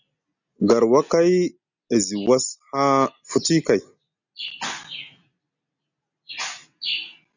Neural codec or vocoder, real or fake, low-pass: none; real; 7.2 kHz